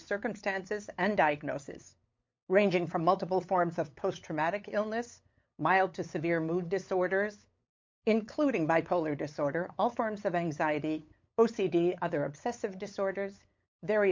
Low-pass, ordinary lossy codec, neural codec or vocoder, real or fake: 7.2 kHz; MP3, 48 kbps; codec, 16 kHz, 8 kbps, FunCodec, trained on LibriTTS, 25 frames a second; fake